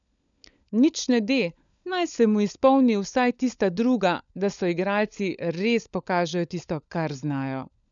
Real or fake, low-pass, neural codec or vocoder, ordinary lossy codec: fake; 7.2 kHz; codec, 16 kHz, 16 kbps, FunCodec, trained on LibriTTS, 50 frames a second; none